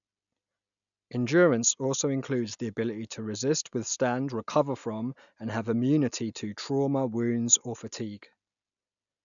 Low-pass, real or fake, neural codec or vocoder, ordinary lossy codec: 7.2 kHz; real; none; none